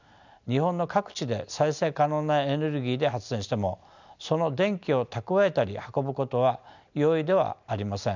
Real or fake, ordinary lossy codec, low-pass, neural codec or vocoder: real; none; 7.2 kHz; none